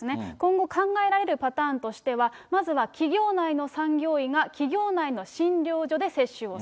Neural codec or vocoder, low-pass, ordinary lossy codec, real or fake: none; none; none; real